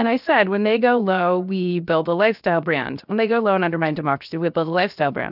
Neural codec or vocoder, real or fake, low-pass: codec, 16 kHz, 0.7 kbps, FocalCodec; fake; 5.4 kHz